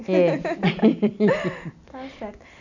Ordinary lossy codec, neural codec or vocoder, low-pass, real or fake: none; none; 7.2 kHz; real